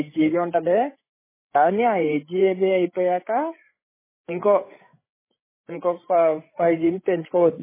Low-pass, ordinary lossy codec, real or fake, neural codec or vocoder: 3.6 kHz; MP3, 16 kbps; fake; codec, 16 kHz, 8 kbps, FreqCodec, larger model